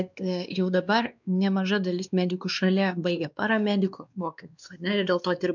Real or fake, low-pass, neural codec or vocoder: fake; 7.2 kHz; codec, 16 kHz, 2 kbps, X-Codec, WavLM features, trained on Multilingual LibriSpeech